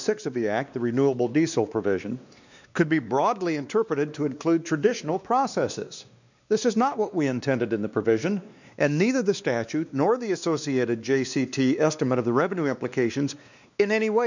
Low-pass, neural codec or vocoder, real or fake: 7.2 kHz; codec, 16 kHz, 2 kbps, X-Codec, WavLM features, trained on Multilingual LibriSpeech; fake